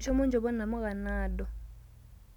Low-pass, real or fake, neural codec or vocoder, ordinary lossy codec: 19.8 kHz; real; none; none